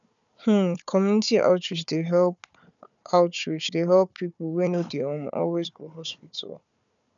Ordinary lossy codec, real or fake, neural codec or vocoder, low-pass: none; fake; codec, 16 kHz, 4 kbps, FunCodec, trained on Chinese and English, 50 frames a second; 7.2 kHz